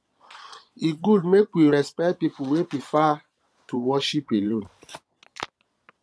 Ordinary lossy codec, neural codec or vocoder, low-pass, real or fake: none; vocoder, 22.05 kHz, 80 mel bands, Vocos; none; fake